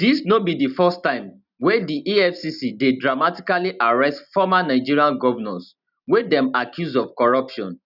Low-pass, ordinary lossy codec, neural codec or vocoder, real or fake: 5.4 kHz; none; none; real